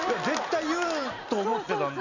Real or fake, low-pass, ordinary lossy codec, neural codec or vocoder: real; 7.2 kHz; none; none